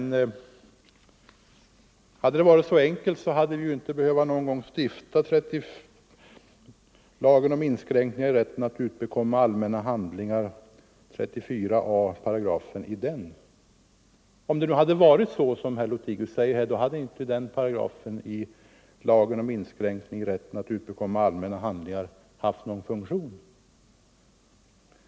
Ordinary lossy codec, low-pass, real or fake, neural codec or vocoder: none; none; real; none